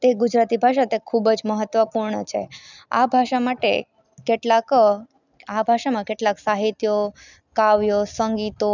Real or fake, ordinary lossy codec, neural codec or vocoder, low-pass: real; none; none; 7.2 kHz